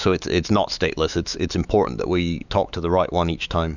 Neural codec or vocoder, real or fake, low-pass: autoencoder, 48 kHz, 128 numbers a frame, DAC-VAE, trained on Japanese speech; fake; 7.2 kHz